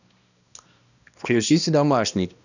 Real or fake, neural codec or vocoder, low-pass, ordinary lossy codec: fake; codec, 16 kHz, 1 kbps, X-Codec, HuBERT features, trained on balanced general audio; 7.2 kHz; none